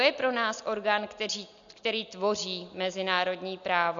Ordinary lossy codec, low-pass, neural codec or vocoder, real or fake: MP3, 96 kbps; 7.2 kHz; none; real